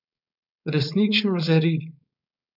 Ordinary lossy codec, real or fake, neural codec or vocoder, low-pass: none; fake; codec, 16 kHz, 4.8 kbps, FACodec; 5.4 kHz